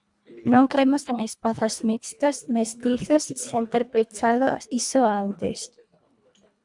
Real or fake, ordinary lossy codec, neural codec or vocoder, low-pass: fake; MP3, 96 kbps; codec, 24 kHz, 1.5 kbps, HILCodec; 10.8 kHz